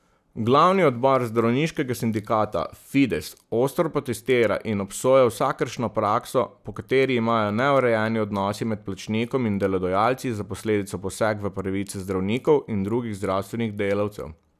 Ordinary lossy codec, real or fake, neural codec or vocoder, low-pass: none; real; none; 14.4 kHz